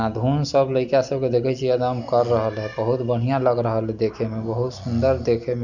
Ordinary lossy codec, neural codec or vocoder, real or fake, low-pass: none; none; real; 7.2 kHz